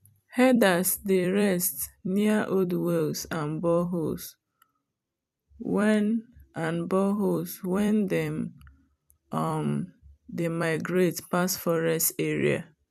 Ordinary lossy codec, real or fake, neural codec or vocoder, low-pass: none; fake; vocoder, 44.1 kHz, 128 mel bands every 256 samples, BigVGAN v2; 14.4 kHz